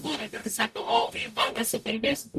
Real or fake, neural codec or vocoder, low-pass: fake; codec, 44.1 kHz, 0.9 kbps, DAC; 14.4 kHz